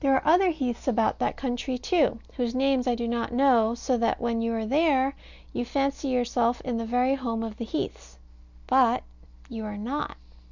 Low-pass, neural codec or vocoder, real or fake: 7.2 kHz; none; real